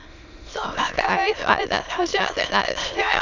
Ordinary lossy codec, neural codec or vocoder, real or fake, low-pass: none; autoencoder, 22.05 kHz, a latent of 192 numbers a frame, VITS, trained on many speakers; fake; 7.2 kHz